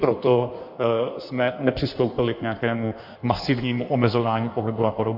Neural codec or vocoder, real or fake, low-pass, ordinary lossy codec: codec, 16 kHz in and 24 kHz out, 1.1 kbps, FireRedTTS-2 codec; fake; 5.4 kHz; MP3, 48 kbps